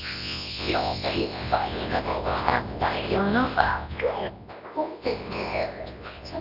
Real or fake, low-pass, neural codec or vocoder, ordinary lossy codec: fake; 5.4 kHz; codec, 24 kHz, 0.9 kbps, WavTokenizer, large speech release; none